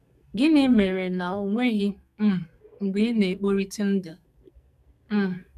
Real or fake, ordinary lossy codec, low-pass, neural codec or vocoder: fake; none; 14.4 kHz; codec, 44.1 kHz, 2.6 kbps, SNAC